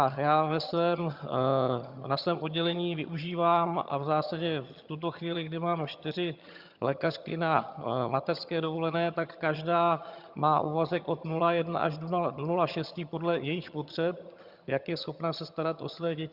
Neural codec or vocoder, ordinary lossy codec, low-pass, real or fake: vocoder, 22.05 kHz, 80 mel bands, HiFi-GAN; Opus, 64 kbps; 5.4 kHz; fake